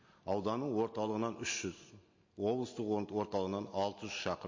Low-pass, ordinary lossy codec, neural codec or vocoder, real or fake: 7.2 kHz; MP3, 32 kbps; none; real